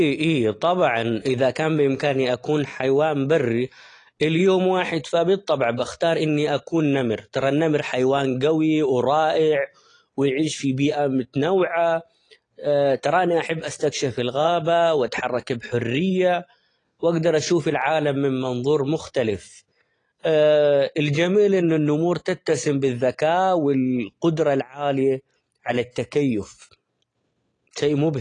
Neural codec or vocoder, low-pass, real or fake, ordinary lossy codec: none; 9.9 kHz; real; AAC, 32 kbps